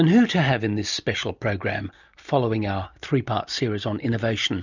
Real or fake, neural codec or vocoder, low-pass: real; none; 7.2 kHz